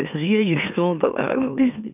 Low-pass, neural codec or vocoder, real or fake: 3.6 kHz; autoencoder, 44.1 kHz, a latent of 192 numbers a frame, MeloTTS; fake